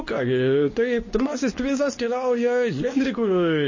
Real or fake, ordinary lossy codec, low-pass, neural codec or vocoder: fake; MP3, 32 kbps; 7.2 kHz; codec, 24 kHz, 0.9 kbps, WavTokenizer, medium speech release version 2